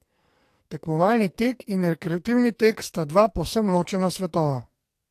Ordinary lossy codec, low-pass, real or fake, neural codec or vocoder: AAC, 64 kbps; 14.4 kHz; fake; codec, 44.1 kHz, 2.6 kbps, SNAC